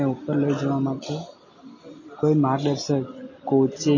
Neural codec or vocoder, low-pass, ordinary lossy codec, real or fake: none; 7.2 kHz; MP3, 32 kbps; real